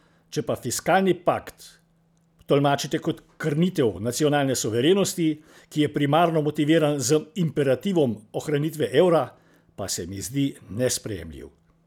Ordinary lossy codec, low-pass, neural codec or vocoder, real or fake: none; 19.8 kHz; none; real